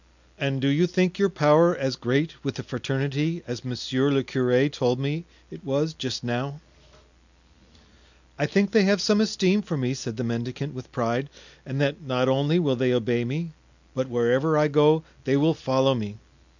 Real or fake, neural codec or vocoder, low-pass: real; none; 7.2 kHz